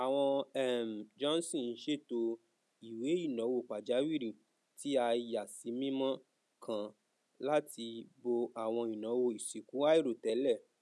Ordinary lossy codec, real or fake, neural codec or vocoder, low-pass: none; real; none; none